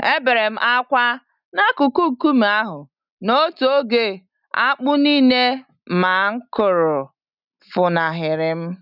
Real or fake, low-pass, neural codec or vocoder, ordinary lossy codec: real; 5.4 kHz; none; none